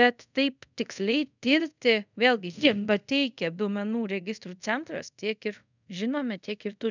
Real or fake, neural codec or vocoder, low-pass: fake; codec, 24 kHz, 0.5 kbps, DualCodec; 7.2 kHz